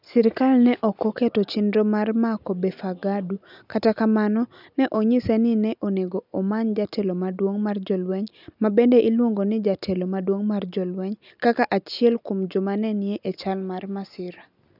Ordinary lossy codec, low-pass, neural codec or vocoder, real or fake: none; 5.4 kHz; none; real